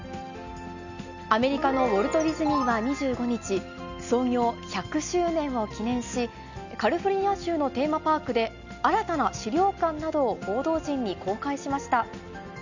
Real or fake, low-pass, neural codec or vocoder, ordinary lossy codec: real; 7.2 kHz; none; none